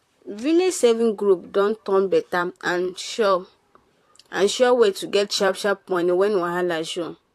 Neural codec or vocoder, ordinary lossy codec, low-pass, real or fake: vocoder, 44.1 kHz, 128 mel bands, Pupu-Vocoder; AAC, 64 kbps; 14.4 kHz; fake